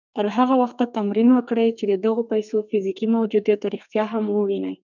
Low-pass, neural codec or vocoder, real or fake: 7.2 kHz; codec, 32 kHz, 1.9 kbps, SNAC; fake